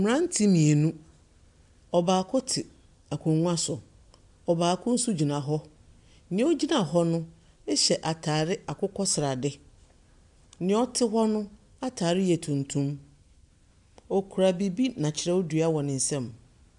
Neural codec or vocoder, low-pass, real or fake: none; 10.8 kHz; real